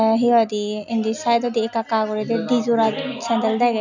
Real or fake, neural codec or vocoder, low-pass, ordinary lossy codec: real; none; 7.2 kHz; none